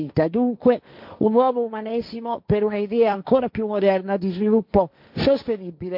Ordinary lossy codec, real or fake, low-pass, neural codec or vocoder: MP3, 48 kbps; fake; 5.4 kHz; codec, 16 kHz, 1.1 kbps, Voila-Tokenizer